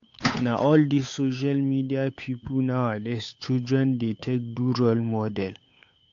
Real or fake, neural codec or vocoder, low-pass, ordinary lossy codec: fake; codec, 16 kHz, 8 kbps, FunCodec, trained on Chinese and English, 25 frames a second; 7.2 kHz; AAC, 48 kbps